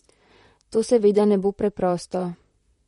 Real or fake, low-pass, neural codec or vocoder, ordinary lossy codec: fake; 19.8 kHz; vocoder, 44.1 kHz, 128 mel bands, Pupu-Vocoder; MP3, 48 kbps